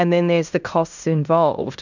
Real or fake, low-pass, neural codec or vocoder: fake; 7.2 kHz; codec, 16 kHz in and 24 kHz out, 0.9 kbps, LongCat-Audio-Codec, fine tuned four codebook decoder